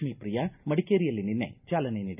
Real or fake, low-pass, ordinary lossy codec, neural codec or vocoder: real; 3.6 kHz; none; none